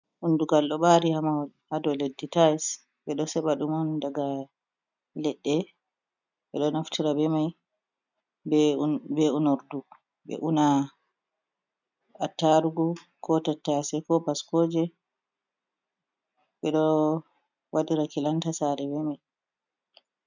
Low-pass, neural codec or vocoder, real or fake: 7.2 kHz; none; real